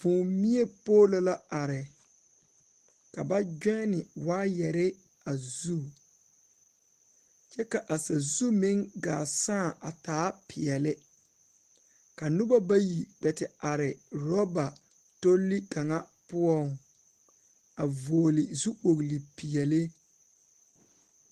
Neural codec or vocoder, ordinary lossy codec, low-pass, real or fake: none; Opus, 16 kbps; 14.4 kHz; real